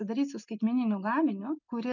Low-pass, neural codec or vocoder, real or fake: 7.2 kHz; none; real